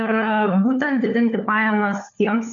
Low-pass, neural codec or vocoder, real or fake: 7.2 kHz; codec, 16 kHz, 4 kbps, FunCodec, trained on LibriTTS, 50 frames a second; fake